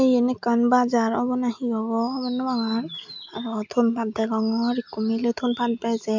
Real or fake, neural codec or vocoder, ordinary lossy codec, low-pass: real; none; MP3, 64 kbps; 7.2 kHz